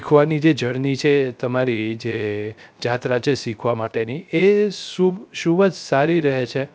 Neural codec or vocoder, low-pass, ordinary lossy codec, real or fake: codec, 16 kHz, 0.3 kbps, FocalCodec; none; none; fake